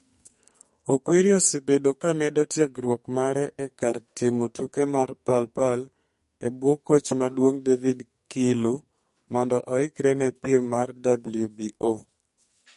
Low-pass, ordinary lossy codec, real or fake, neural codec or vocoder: 14.4 kHz; MP3, 48 kbps; fake; codec, 44.1 kHz, 2.6 kbps, SNAC